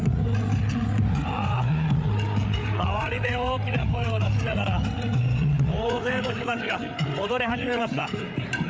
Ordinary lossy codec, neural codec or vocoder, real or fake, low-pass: none; codec, 16 kHz, 8 kbps, FreqCodec, larger model; fake; none